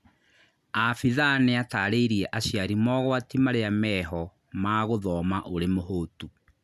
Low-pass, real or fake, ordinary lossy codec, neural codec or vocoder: 14.4 kHz; real; none; none